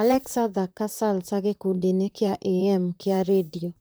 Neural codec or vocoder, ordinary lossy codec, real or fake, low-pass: vocoder, 44.1 kHz, 128 mel bands, Pupu-Vocoder; none; fake; none